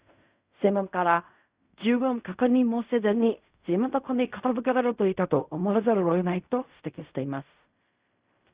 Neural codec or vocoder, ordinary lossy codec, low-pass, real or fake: codec, 16 kHz in and 24 kHz out, 0.4 kbps, LongCat-Audio-Codec, fine tuned four codebook decoder; Opus, 64 kbps; 3.6 kHz; fake